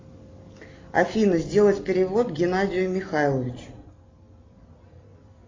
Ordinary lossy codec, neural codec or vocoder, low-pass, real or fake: AAC, 32 kbps; none; 7.2 kHz; real